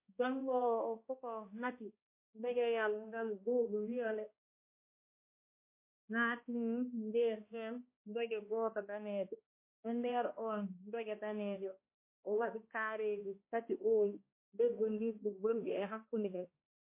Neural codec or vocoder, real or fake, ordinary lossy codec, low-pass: codec, 16 kHz, 1 kbps, X-Codec, HuBERT features, trained on balanced general audio; fake; MP3, 24 kbps; 3.6 kHz